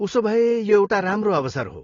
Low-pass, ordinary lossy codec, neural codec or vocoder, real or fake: 7.2 kHz; AAC, 32 kbps; none; real